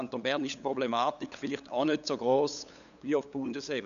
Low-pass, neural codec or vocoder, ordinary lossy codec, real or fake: 7.2 kHz; codec, 16 kHz, 8 kbps, FunCodec, trained on LibriTTS, 25 frames a second; none; fake